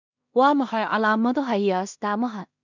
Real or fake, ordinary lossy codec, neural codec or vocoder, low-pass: fake; none; codec, 16 kHz in and 24 kHz out, 0.4 kbps, LongCat-Audio-Codec, two codebook decoder; 7.2 kHz